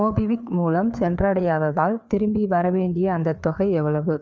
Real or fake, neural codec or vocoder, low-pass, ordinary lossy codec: fake; codec, 16 kHz, 4 kbps, FreqCodec, larger model; none; none